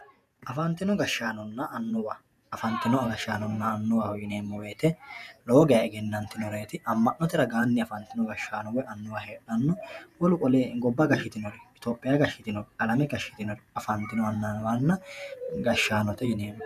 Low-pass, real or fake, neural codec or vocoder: 14.4 kHz; fake; vocoder, 48 kHz, 128 mel bands, Vocos